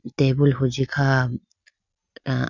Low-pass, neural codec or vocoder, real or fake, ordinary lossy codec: 7.2 kHz; none; real; none